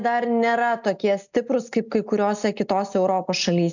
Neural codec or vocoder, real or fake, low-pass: none; real; 7.2 kHz